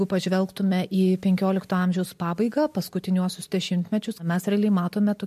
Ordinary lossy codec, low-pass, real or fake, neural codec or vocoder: MP3, 64 kbps; 14.4 kHz; real; none